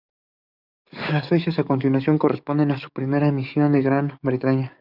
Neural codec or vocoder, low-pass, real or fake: codec, 44.1 kHz, 7.8 kbps, DAC; 5.4 kHz; fake